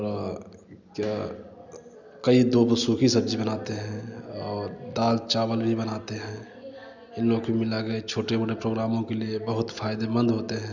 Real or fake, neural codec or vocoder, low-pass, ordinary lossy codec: real; none; 7.2 kHz; none